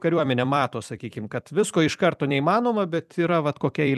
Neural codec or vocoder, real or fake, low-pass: vocoder, 44.1 kHz, 128 mel bands every 256 samples, BigVGAN v2; fake; 14.4 kHz